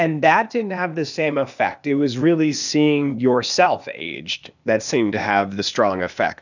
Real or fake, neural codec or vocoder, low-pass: fake; codec, 16 kHz, 0.8 kbps, ZipCodec; 7.2 kHz